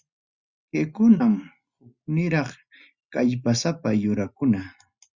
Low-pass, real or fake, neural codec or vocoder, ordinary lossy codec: 7.2 kHz; real; none; Opus, 64 kbps